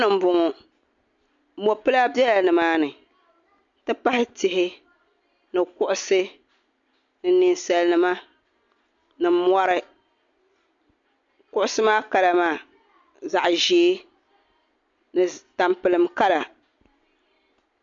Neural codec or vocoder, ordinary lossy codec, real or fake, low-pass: none; MP3, 48 kbps; real; 7.2 kHz